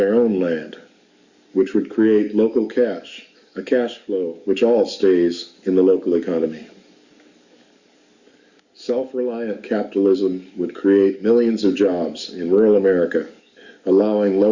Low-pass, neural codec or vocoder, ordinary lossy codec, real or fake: 7.2 kHz; codec, 44.1 kHz, 7.8 kbps, DAC; Opus, 64 kbps; fake